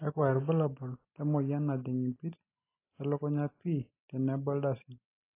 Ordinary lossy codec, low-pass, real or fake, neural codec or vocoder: AAC, 24 kbps; 3.6 kHz; real; none